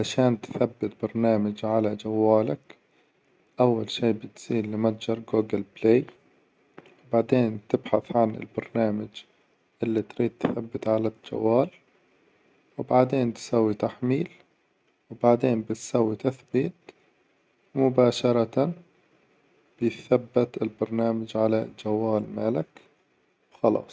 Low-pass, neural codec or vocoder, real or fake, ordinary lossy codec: none; none; real; none